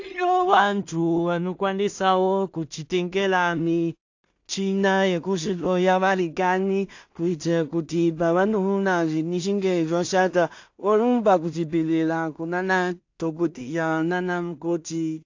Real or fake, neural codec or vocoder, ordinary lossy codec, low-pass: fake; codec, 16 kHz in and 24 kHz out, 0.4 kbps, LongCat-Audio-Codec, two codebook decoder; AAC, 48 kbps; 7.2 kHz